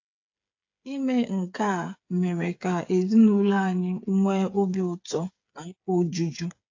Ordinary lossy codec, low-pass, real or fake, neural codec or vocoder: AAC, 48 kbps; 7.2 kHz; fake; codec, 16 kHz, 8 kbps, FreqCodec, smaller model